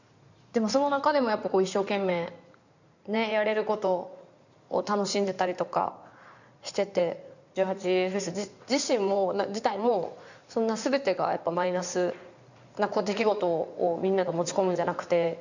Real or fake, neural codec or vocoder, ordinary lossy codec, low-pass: fake; codec, 16 kHz in and 24 kHz out, 2.2 kbps, FireRedTTS-2 codec; none; 7.2 kHz